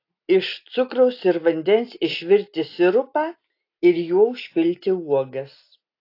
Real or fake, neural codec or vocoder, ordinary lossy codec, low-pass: real; none; AAC, 32 kbps; 5.4 kHz